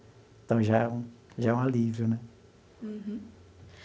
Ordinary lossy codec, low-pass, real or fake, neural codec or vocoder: none; none; real; none